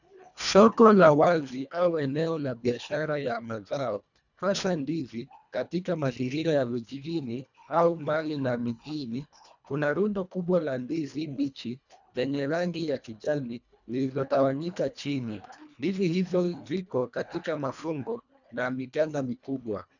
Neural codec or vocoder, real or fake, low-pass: codec, 24 kHz, 1.5 kbps, HILCodec; fake; 7.2 kHz